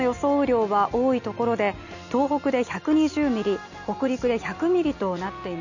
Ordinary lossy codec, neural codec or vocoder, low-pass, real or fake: none; none; 7.2 kHz; real